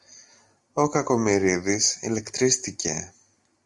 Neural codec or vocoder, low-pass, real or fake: none; 10.8 kHz; real